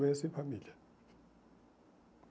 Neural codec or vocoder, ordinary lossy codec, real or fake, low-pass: none; none; real; none